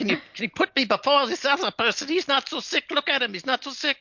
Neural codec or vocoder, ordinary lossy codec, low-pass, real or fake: none; MP3, 48 kbps; 7.2 kHz; real